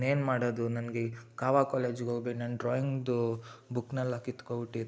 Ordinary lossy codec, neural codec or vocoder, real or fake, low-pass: none; none; real; none